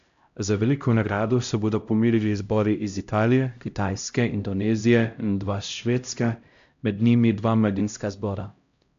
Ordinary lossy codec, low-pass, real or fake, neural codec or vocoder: AAC, 64 kbps; 7.2 kHz; fake; codec, 16 kHz, 0.5 kbps, X-Codec, HuBERT features, trained on LibriSpeech